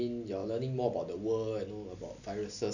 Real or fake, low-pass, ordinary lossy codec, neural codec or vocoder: real; 7.2 kHz; none; none